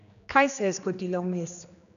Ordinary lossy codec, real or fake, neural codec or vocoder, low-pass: none; fake; codec, 16 kHz, 2 kbps, X-Codec, HuBERT features, trained on general audio; 7.2 kHz